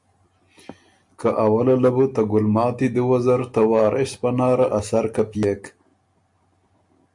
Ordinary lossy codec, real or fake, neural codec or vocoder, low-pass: MP3, 64 kbps; real; none; 10.8 kHz